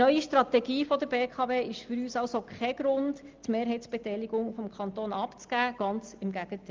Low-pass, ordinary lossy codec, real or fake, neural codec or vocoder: 7.2 kHz; Opus, 32 kbps; real; none